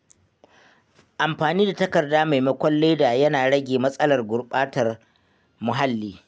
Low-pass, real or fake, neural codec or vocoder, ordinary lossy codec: none; real; none; none